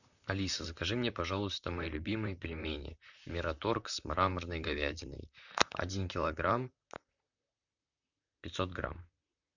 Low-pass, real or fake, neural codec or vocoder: 7.2 kHz; fake; vocoder, 44.1 kHz, 128 mel bands, Pupu-Vocoder